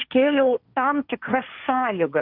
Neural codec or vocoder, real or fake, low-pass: codec, 16 kHz, 1.1 kbps, Voila-Tokenizer; fake; 5.4 kHz